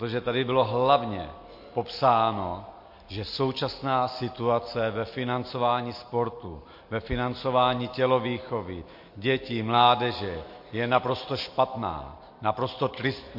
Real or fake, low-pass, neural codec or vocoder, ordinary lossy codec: real; 5.4 kHz; none; MP3, 32 kbps